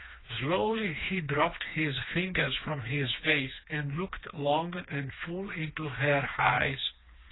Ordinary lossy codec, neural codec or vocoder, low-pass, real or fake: AAC, 16 kbps; codec, 16 kHz, 2 kbps, FreqCodec, smaller model; 7.2 kHz; fake